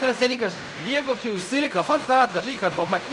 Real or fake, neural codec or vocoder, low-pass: fake; codec, 16 kHz in and 24 kHz out, 0.4 kbps, LongCat-Audio-Codec, fine tuned four codebook decoder; 10.8 kHz